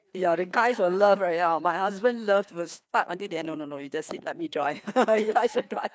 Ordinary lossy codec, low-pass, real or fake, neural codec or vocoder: none; none; fake; codec, 16 kHz, 2 kbps, FreqCodec, larger model